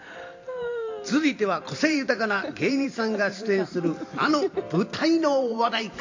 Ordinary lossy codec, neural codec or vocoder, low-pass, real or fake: AAC, 48 kbps; vocoder, 44.1 kHz, 128 mel bands every 512 samples, BigVGAN v2; 7.2 kHz; fake